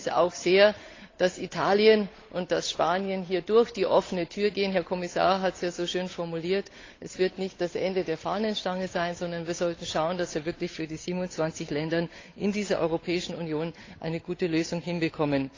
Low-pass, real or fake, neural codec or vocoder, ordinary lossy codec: 7.2 kHz; fake; codec, 16 kHz, 8 kbps, FunCodec, trained on Chinese and English, 25 frames a second; AAC, 32 kbps